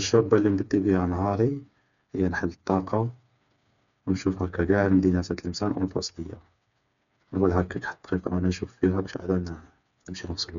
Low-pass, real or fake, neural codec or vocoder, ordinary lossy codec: 7.2 kHz; fake; codec, 16 kHz, 4 kbps, FreqCodec, smaller model; none